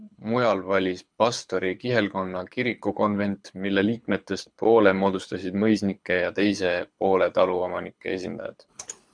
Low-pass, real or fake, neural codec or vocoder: 9.9 kHz; fake; codec, 24 kHz, 6 kbps, HILCodec